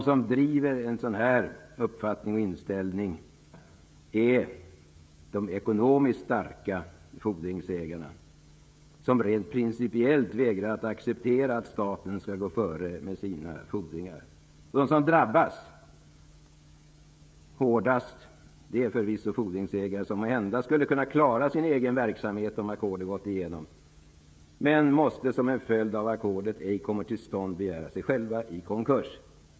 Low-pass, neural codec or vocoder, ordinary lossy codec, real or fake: none; codec, 16 kHz, 16 kbps, FreqCodec, smaller model; none; fake